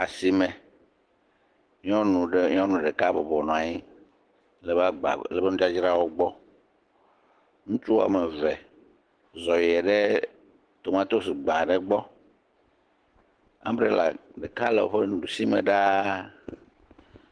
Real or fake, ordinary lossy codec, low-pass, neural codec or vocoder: fake; Opus, 16 kbps; 9.9 kHz; autoencoder, 48 kHz, 128 numbers a frame, DAC-VAE, trained on Japanese speech